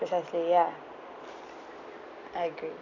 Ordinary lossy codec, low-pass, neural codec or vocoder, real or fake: none; 7.2 kHz; none; real